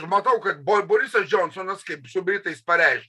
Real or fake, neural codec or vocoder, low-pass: real; none; 14.4 kHz